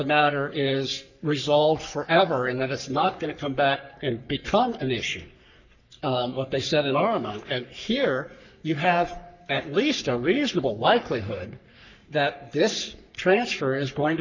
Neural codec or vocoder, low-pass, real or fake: codec, 44.1 kHz, 3.4 kbps, Pupu-Codec; 7.2 kHz; fake